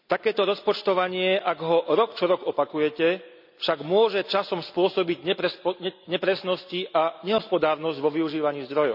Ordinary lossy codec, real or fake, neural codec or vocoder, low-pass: none; real; none; 5.4 kHz